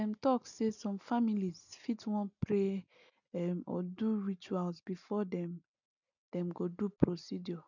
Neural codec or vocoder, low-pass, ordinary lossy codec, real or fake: none; 7.2 kHz; none; real